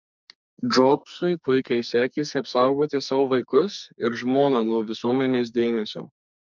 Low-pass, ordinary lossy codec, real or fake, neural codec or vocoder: 7.2 kHz; MP3, 64 kbps; fake; codec, 44.1 kHz, 2.6 kbps, SNAC